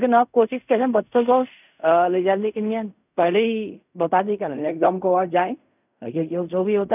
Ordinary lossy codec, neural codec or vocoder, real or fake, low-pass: none; codec, 16 kHz in and 24 kHz out, 0.4 kbps, LongCat-Audio-Codec, fine tuned four codebook decoder; fake; 3.6 kHz